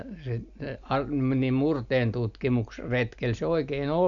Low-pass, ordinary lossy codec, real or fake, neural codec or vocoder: 7.2 kHz; none; real; none